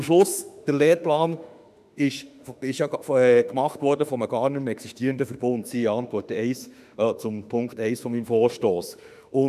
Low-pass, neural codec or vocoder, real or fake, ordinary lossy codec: 14.4 kHz; autoencoder, 48 kHz, 32 numbers a frame, DAC-VAE, trained on Japanese speech; fake; none